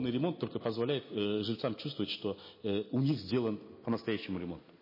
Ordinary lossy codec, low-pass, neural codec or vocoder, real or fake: MP3, 24 kbps; 5.4 kHz; none; real